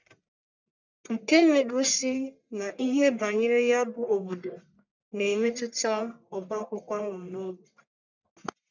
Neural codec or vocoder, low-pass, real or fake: codec, 44.1 kHz, 1.7 kbps, Pupu-Codec; 7.2 kHz; fake